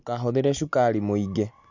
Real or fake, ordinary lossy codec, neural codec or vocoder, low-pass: real; none; none; 7.2 kHz